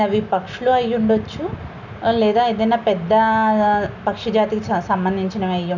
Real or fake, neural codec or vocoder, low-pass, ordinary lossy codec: real; none; 7.2 kHz; none